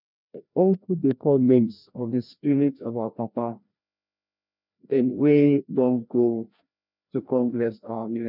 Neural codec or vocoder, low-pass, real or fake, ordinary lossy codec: codec, 16 kHz, 1 kbps, FreqCodec, larger model; 5.4 kHz; fake; none